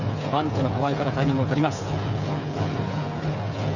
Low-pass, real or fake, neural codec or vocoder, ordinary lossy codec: 7.2 kHz; fake; codec, 16 kHz, 8 kbps, FreqCodec, smaller model; none